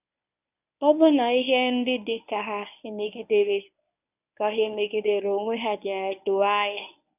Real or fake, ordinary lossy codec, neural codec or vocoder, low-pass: fake; none; codec, 24 kHz, 0.9 kbps, WavTokenizer, medium speech release version 1; 3.6 kHz